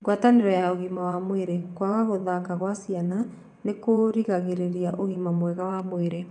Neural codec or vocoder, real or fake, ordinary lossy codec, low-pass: vocoder, 22.05 kHz, 80 mel bands, WaveNeXt; fake; none; 9.9 kHz